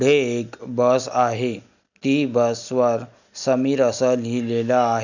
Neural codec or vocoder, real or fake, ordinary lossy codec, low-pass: none; real; none; 7.2 kHz